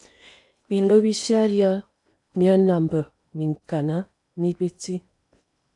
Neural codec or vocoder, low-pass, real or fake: codec, 16 kHz in and 24 kHz out, 0.8 kbps, FocalCodec, streaming, 65536 codes; 10.8 kHz; fake